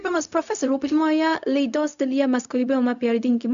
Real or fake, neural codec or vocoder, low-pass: fake; codec, 16 kHz, 0.4 kbps, LongCat-Audio-Codec; 7.2 kHz